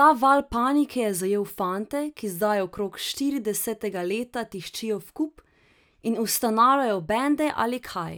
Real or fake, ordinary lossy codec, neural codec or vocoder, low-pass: real; none; none; none